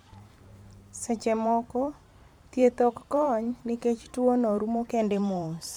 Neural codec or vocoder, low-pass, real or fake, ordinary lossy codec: vocoder, 44.1 kHz, 128 mel bands every 512 samples, BigVGAN v2; 19.8 kHz; fake; MP3, 96 kbps